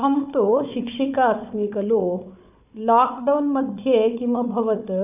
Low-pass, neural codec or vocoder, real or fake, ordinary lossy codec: 3.6 kHz; codec, 16 kHz, 4 kbps, FunCodec, trained on Chinese and English, 50 frames a second; fake; none